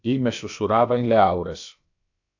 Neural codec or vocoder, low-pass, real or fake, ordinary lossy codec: codec, 16 kHz, about 1 kbps, DyCAST, with the encoder's durations; 7.2 kHz; fake; AAC, 48 kbps